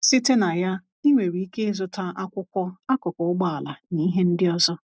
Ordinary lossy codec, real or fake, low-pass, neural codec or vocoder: none; real; none; none